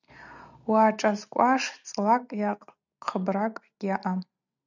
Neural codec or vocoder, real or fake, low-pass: none; real; 7.2 kHz